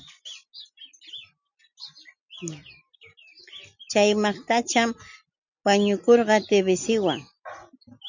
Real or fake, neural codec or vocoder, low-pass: real; none; 7.2 kHz